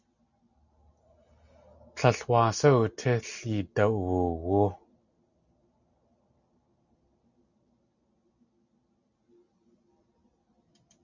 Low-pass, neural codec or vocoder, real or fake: 7.2 kHz; none; real